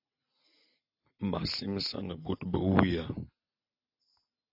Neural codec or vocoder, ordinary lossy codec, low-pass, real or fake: none; AAC, 48 kbps; 5.4 kHz; real